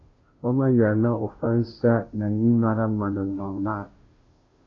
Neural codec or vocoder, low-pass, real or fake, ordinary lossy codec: codec, 16 kHz, 0.5 kbps, FunCodec, trained on Chinese and English, 25 frames a second; 7.2 kHz; fake; AAC, 32 kbps